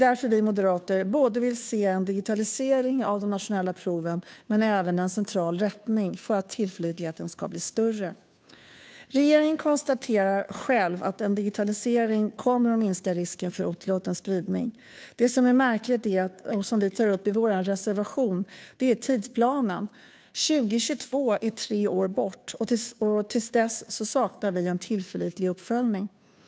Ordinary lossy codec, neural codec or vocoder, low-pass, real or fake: none; codec, 16 kHz, 2 kbps, FunCodec, trained on Chinese and English, 25 frames a second; none; fake